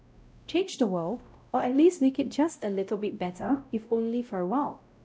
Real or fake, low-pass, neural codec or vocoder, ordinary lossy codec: fake; none; codec, 16 kHz, 0.5 kbps, X-Codec, WavLM features, trained on Multilingual LibriSpeech; none